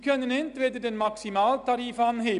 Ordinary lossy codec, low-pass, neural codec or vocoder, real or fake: none; 10.8 kHz; none; real